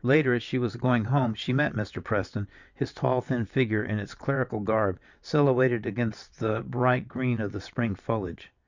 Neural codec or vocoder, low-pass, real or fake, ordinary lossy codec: vocoder, 22.05 kHz, 80 mel bands, WaveNeXt; 7.2 kHz; fake; Opus, 64 kbps